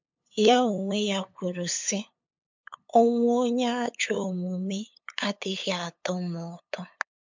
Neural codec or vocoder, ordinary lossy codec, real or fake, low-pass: codec, 16 kHz, 8 kbps, FunCodec, trained on LibriTTS, 25 frames a second; MP3, 64 kbps; fake; 7.2 kHz